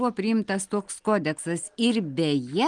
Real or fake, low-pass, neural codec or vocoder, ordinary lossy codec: real; 9.9 kHz; none; Opus, 24 kbps